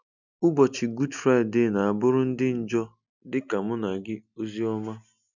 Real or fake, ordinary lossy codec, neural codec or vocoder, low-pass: real; none; none; 7.2 kHz